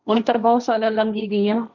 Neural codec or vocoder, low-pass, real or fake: codec, 16 kHz, 1.1 kbps, Voila-Tokenizer; 7.2 kHz; fake